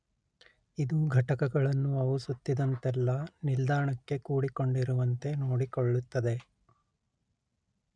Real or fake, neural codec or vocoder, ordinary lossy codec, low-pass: real; none; none; 9.9 kHz